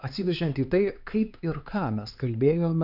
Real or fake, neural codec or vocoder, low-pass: fake; codec, 16 kHz, 4 kbps, X-Codec, HuBERT features, trained on LibriSpeech; 5.4 kHz